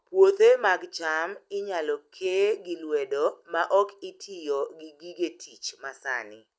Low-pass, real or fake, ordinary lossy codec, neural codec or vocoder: none; real; none; none